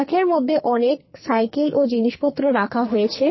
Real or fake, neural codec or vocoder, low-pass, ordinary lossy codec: fake; codec, 44.1 kHz, 2.6 kbps, SNAC; 7.2 kHz; MP3, 24 kbps